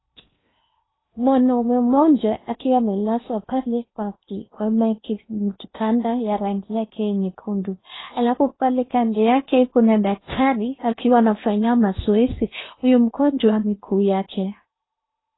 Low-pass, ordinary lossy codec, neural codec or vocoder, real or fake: 7.2 kHz; AAC, 16 kbps; codec, 16 kHz in and 24 kHz out, 0.8 kbps, FocalCodec, streaming, 65536 codes; fake